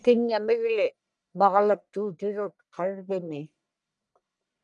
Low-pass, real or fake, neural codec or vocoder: 10.8 kHz; fake; codec, 44.1 kHz, 1.7 kbps, Pupu-Codec